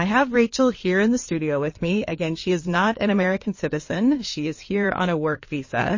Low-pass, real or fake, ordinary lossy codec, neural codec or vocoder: 7.2 kHz; fake; MP3, 32 kbps; codec, 16 kHz in and 24 kHz out, 2.2 kbps, FireRedTTS-2 codec